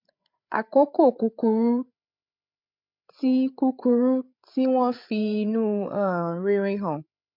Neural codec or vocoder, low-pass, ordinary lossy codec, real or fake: codec, 16 kHz, 8 kbps, FreqCodec, larger model; 5.4 kHz; MP3, 48 kbps; fake